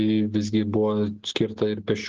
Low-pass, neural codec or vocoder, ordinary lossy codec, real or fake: 7.2 kHz; none; Opus, 16 kbps; real